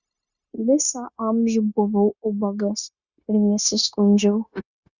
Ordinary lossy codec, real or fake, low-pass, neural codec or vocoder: Opus, 64 kbps; fake; 7.2 kHz; codec, 16 kHz, 0.9 kbps, LongCat-Audio-Codec